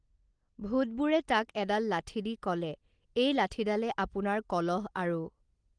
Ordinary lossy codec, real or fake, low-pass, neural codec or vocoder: Opus, 24 kbps; real; 9.9 kHz; none